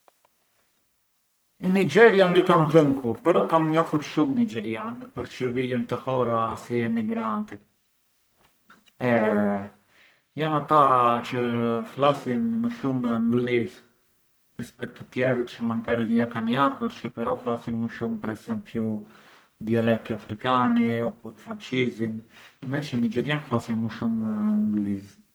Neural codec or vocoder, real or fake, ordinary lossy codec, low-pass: codec, 44.1 kHz, 1.7 kbps, Pupu-Codec; fake; none; none